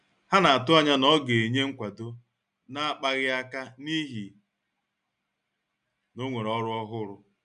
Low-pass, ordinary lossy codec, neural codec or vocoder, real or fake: 9.9 kHz; none; none; real